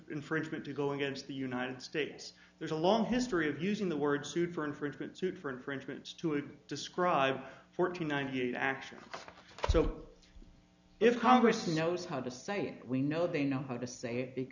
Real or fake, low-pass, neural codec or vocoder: real; 7.2 kHz; none